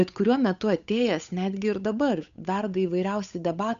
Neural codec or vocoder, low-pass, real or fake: codec, 16 kHz, 8 kbps, FunCodec, trained on Chinese and English, 25 frames a second; 7.2 kHz; fake